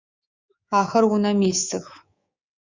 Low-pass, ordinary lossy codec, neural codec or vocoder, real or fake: 7.2 kHz; Opus, 64 kbps; codec, 24 kHz, 3.1 kbps, DualCodec; fake